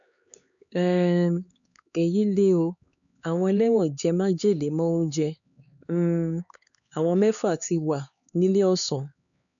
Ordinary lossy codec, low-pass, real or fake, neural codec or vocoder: none; 7.2 kHz; fake; codec, 16 kHz, 4 kbps, X-Codec, HuBERT features, trained on LibriSpeech